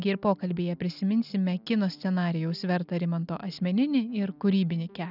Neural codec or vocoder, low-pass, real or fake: none; 5.4 kHz; real